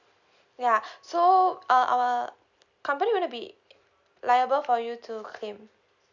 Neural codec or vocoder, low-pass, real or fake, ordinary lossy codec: none; 7.2 kHz; real; none